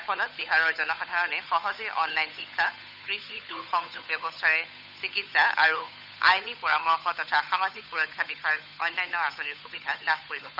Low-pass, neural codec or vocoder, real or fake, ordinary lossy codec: 5.4 kHz; codec, 16 kHz, 8 kbps, FunCodec, trained on Chinese and English, 25 frames a second; fake; none